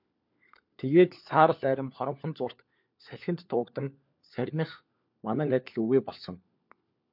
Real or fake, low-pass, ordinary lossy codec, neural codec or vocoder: fake; 5.4 kHz; MP3, 48 kbps; codec, 16 kHz, 4 kbps, FunCodec, trained on LibriTTS, 50 frames a second